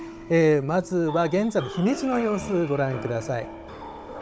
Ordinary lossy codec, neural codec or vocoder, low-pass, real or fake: none; codec, 16 kHz, 16 kbps, FunCodec, trained on Chinese and English, 50 frames a second; none; fake